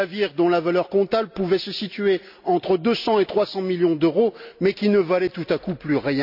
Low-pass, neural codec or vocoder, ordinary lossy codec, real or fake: 5.4 kHz; none; none; real